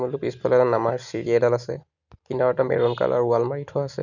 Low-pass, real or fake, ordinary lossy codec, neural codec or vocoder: 7.2 kHz; real; none; none